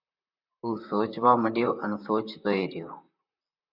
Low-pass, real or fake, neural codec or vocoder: 5.4 kHz; fake; vocoder, 44.1 kHz, 128 mel bands, Pupu-Vocoder